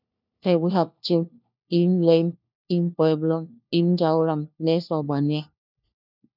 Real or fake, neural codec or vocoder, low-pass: fake; codec, 16 kHz, 1 kbps, FunCodec, trained on LibriTTS, 50 frames a second; 5.4 kHz